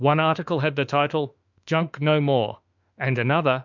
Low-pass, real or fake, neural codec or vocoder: 7.2 kHz; fake; autoencoder, 48 kHz, 32 numbers a frame, DAC-VAE, trained on Japanese speech